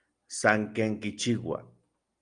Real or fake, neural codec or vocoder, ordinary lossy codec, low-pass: real; none; Opus, 32 kbps; 9.9 kHz